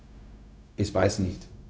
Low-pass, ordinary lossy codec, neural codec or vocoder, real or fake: none; none; codec, 16 kHz, 0.4 kbps, LongCat-Audio-Codec; fake